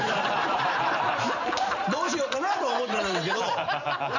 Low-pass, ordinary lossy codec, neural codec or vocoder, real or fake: 7.2 kHz; none; vocoder, 44.1 kHz, 128 mel bands every 512 samples, BigVGAN v2; fake